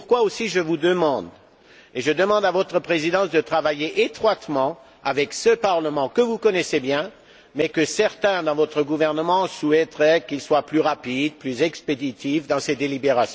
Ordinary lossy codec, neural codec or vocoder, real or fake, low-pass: none; none; real; none